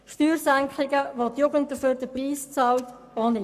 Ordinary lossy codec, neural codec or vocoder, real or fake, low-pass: none; codec, 44.1 kHz, 7.8 kbps, Pupu-Codec; fake; 14.4 kHz